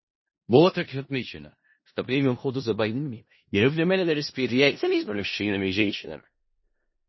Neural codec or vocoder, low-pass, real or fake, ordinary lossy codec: codec, 16 kHz in and 24 kHz out, 0.4 kbps, LongCat-Audio-Codec, four codebook decoder; 7.2 kHz; fake; MP3, 24 kbps